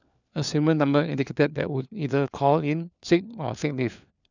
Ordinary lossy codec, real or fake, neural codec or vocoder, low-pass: none; fake; codec, 16 kHz, 4 kbps, FunCodec, trained on LibriTTS, 50 frames a second; 7.2 kHz